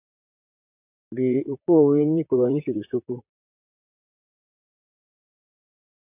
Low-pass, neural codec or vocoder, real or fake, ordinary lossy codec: 3.6 kHz; vocoder, 44.1 kHz, 128 mel bands, Pupu-Vocoder; fake; AAC, 32 kbps